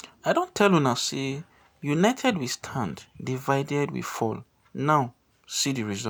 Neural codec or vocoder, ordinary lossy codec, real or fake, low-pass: none; none; real; none